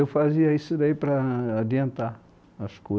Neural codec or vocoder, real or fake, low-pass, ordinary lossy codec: none; real; none; none